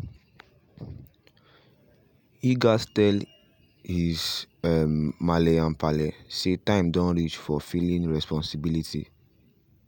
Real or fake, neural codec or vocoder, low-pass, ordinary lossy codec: real; none; 19.8 kHz; none